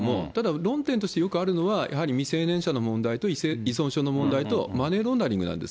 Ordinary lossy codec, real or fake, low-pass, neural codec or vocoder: none; real; none; none